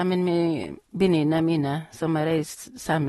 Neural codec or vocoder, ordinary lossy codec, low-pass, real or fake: none; AAC, 32 kbps; 19.8 kHz; real